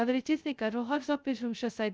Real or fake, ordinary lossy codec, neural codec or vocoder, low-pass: fake; none; codec, 16 kHz, 0.2 kbps, FocalCodec; none